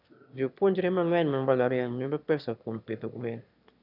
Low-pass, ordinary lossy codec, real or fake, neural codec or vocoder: 5.4 kHz; none; fake; autoencoder, 22.05 kHz, a latent of 192 numbers a frame, VITS, trained on one speaker